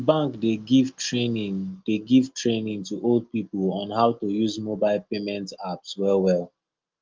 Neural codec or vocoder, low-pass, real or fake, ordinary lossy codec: none; 7.2 kHz; real; Opus, 24 kbps